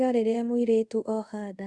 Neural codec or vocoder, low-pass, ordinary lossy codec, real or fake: codec, 24 kHz, 0.5 kbps, DualCodec; 10.8 kHz; Opus, 64 kbps; fake